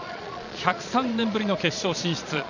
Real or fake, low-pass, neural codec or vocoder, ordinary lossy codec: fake; 7.2 kHz; vocoder, 22.05 kHz, 80 mel bands, Vocos; none